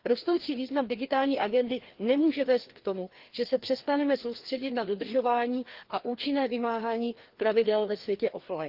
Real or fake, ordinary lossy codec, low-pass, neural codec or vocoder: fake; Opus, 16 kbps; 5.4 kHz; codec, 16 kHz, 2 kbps, FreqCodec, larger model